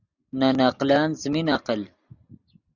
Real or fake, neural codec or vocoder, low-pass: real; none; 7.2 kHz